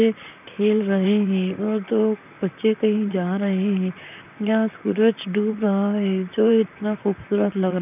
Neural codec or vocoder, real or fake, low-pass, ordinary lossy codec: vocoder, 44.1 kHz, 128 mel bands, Pupu-Vocoder; fake; 3.6 kHz; none